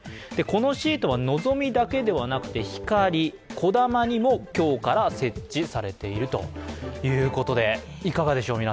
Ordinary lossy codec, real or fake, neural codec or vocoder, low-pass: none; real; none; none